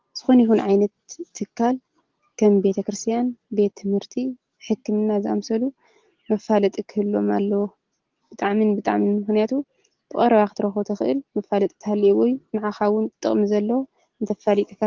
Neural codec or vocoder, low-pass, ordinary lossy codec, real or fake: none; 7.2 kHz; Opus, 16 kbps; real